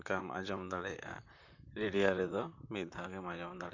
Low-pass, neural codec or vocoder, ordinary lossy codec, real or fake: 7.2 kHz; vocoder, 44.1 kHz, 128 mel bands, Pupu-Vocoder; none; fake